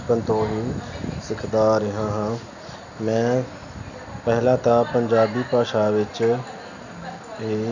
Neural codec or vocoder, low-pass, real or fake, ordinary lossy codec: none; 7.2 kHz; real; none